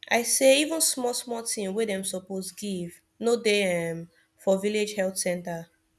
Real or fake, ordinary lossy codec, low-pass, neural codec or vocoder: real; none; none; none